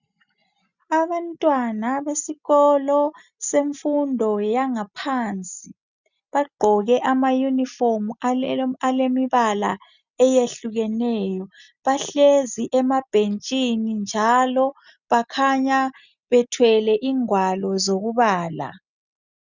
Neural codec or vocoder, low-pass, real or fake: none; 7.2 kHz; real